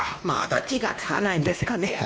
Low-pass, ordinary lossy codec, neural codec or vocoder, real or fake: none; none; codec, 16 kHz, 1 kbps, X-Codec, WavLM features, trained on Multilingual LibriSpeech; fake